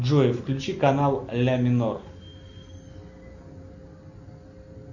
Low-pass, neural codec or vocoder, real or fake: 7.2 kHz; none; real